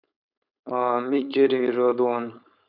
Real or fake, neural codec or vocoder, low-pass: fake; codec, 16 kHz, 4.8 kbps, FACodec; 5.4 kHz